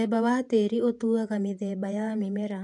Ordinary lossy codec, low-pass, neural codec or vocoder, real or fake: MP3, 96 kbps; 10.8 kHz; vocoder, 48 kHz, 128 mel bands, Vocos; fake